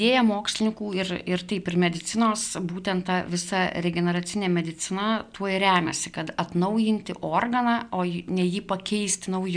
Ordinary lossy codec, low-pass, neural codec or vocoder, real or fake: MP3, 96 kbps; 9.9 kHz; none; real